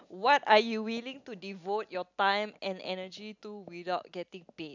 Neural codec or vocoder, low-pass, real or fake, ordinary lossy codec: none; 7.2 kHz; real; none